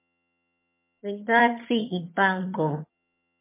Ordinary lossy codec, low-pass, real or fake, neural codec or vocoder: MP3, 24 kbps; 3.6 kHz; fake; vocoder, 22.05 kHz, 80 mel bands, HiFi-GAN